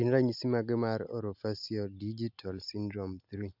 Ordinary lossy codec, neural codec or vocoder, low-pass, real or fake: none; none; 5.4 kHz; real